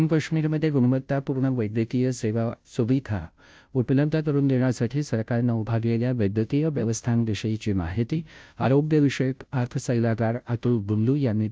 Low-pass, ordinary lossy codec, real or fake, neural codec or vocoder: none; none; fake; codec, 16 kHz, 0.5 kbps, FunCodec, trained on Chinese and English, 25 frames a second